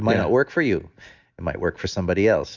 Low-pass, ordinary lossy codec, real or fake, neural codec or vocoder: 7.2 kHz; Opus, 64 kbps; real; none